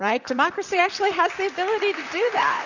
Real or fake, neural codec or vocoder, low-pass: fake; vocoder, 44.1 kHz, 128 mel bands, Pupu-Vocoder; 7.2 kHz